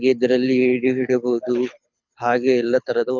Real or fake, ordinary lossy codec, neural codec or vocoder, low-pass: fake; none; codec, 24 kHz, 6 kbps, HILCodec; 7.2 kHz